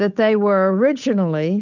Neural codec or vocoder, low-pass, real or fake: codec, 16 kHz, 8 kbps, FunCodec, trained on Chinese and English, 25 frames a second; 7.2 kHz; fake